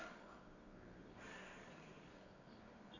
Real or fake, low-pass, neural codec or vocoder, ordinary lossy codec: fake; 7.2 kHz; codec, 24 kHz, 0.9 kbps, WavTokenizer, medium music audio release; none